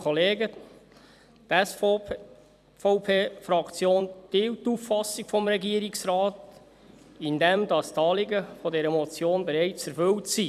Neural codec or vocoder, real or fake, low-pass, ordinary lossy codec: vocoder, 44.1 kHz, 128 mel bands every 256 samples, BigVGAN v2; fake; 14.4 kHz; none